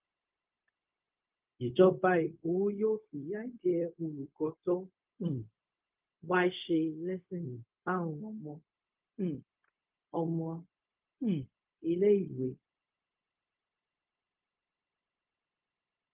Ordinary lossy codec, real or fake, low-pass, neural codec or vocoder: Opus, 24 kbps; fake; 3.6 kHz; codec, 16 kHz, 0.4 kbps, LongCat-Audio-Codec